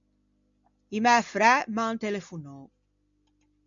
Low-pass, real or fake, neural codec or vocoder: 7.2 kHz; real; none